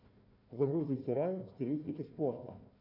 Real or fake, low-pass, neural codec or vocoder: fake; 5.4 kHz; codec, 16 kHz, 1 kbps, FunCodec, trained on Chinese and English, 50 frames a second